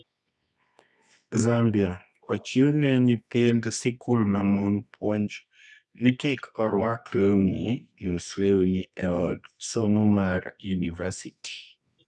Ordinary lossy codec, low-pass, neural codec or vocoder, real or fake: none; none; codec, 24 kHz, 0.9 kbps, WavTokenizer, medium music audio release; fake